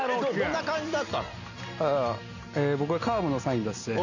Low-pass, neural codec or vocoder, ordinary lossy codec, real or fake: 7.2 kHz; none; none; real